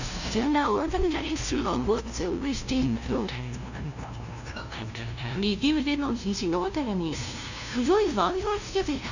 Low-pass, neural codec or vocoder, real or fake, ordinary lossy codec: 7.2 kHz; codec, 16 kHz, 0.5 kbps, FunCodec, trained on LibriTTS, 25 frames a second; fake; none